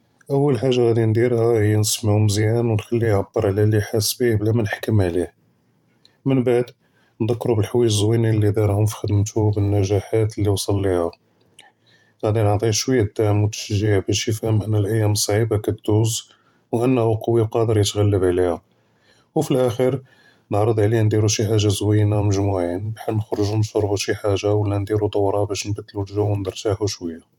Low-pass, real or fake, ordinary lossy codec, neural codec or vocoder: 19.8 kHz; fake; none; vocoder, 44.1 kHz, 128 mel bands every 512 samples, BigVGAN v2